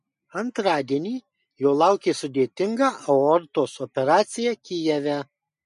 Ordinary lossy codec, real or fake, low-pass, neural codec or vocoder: MP3, 48 kbps; real; 14.4 kHz; none